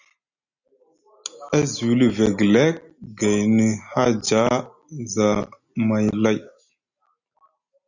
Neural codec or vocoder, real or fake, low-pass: none; real; 7.2 kHz